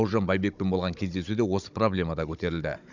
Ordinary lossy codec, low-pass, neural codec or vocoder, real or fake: none; 7.2 kHz; codec, 16 kHz, 16 kbps, FunCodec, trained on Chinese and English, 50 frames a second; fake